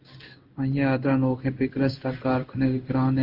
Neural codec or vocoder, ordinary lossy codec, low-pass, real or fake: codec, 16 kHz in and 24 kHz out, 1 kbps, XY-Tokenizer; Opus, 32 kbps; 5.4 kHz; fake